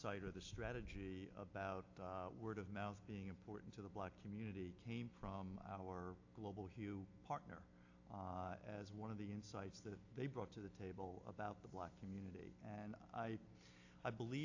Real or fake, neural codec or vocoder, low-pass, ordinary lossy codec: real; none; 7.2 kHz; AAC, 48 kbps